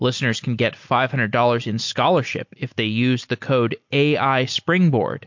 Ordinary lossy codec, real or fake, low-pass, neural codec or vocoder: MP3, 48 kbps; real; 7.2 kHz; none